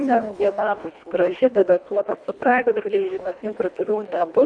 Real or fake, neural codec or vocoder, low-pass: fake; codec, 24 kHz, 1.5 kbps, HILCodec; 9.9 kHz